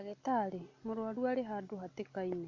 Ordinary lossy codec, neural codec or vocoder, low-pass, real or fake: MP3, 48 kbps; none; 7.2 kHz; real